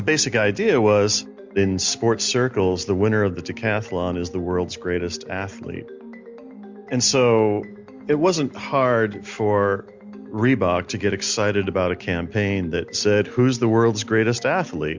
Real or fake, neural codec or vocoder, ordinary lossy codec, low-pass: real; none; MP3, 64 kbps; 7.2 kHz